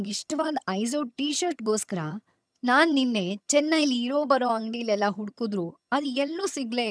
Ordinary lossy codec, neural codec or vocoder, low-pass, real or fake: none; vocoder, 22.05 kHz, 80 mel bands, HiFi-GAN; none; fake